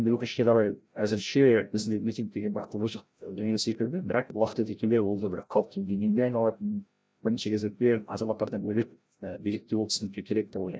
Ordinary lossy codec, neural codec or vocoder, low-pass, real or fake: none; codec, 16 kHz, 0.5 kbps, FreqCodec, larger model; none; fake